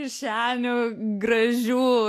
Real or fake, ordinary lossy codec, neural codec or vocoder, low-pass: real; AAC, 64 kbps; none; 14.4 kHz